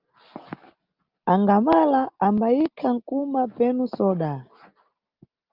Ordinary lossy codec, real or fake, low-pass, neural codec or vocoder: Opus, 24 kbps; real; 5.4 kHz; none